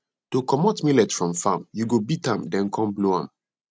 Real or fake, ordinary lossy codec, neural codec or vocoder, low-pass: real; none; none; none